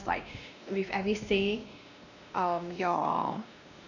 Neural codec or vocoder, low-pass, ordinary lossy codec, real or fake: codec, 16 kHz, 1 kbps, X-Codec, WavLM features, trained on Multilingual LibriSpeech; 7.2 kHz; none; fake